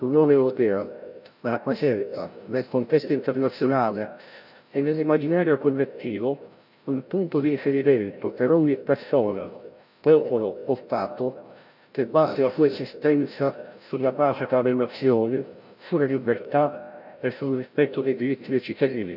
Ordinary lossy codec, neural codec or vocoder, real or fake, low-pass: none; codec, 16 kHz, 0.5 kbps, FreqCodec, larger model; fake; 5.4 kHz